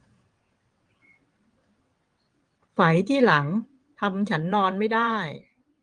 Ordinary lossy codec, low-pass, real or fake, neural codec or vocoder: Opus, 24 kbps; 9.9 kHz; fake; vocoder, 22.05 kHz, 80 mel bands, WaveNeXt